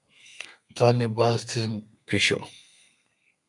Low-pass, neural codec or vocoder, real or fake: 10.8 kHz; codec, 32 kHz, 1.9 kbps, SNAC; fake